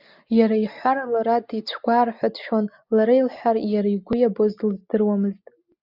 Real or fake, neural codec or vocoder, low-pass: real; none; 5.4 kHz